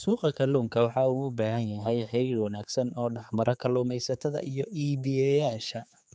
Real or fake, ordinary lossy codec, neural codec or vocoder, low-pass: fake; none; codec, 16 kHz, 4 kbps, X-Codec, HuBERT features, trained on general audio; none